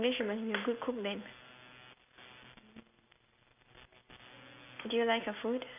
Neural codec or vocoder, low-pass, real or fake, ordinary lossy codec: none; 3.6 kHz; real; none